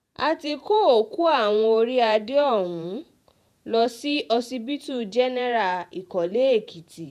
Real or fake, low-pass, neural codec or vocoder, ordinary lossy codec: fake; 14.4 kHz; vocoder, 48 kHz, 128 mel bands, Vocos; none